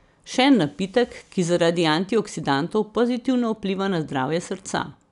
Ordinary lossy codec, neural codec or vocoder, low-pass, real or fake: none; none; 10.8 kHz; real